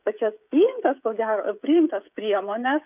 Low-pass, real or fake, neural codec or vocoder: 3.6 kHz; fake; codec, 16 kHz, 16 kbps, FreqCodec, smaller model